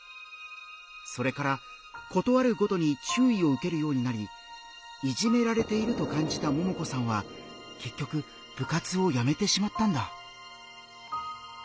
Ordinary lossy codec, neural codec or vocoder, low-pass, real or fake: none; none; none; real